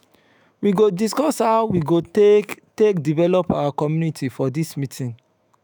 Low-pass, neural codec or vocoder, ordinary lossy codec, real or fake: none; autoencoder, 48 kHz, 128 numbers a frame, DAC-VAE, trained on Japanese speech; none; fake